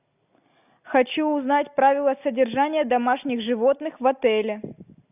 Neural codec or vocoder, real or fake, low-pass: none; real; 3.6 kHz